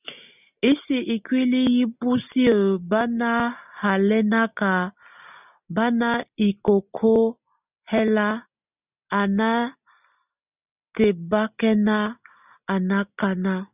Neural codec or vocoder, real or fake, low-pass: none; real; 3.6 kHz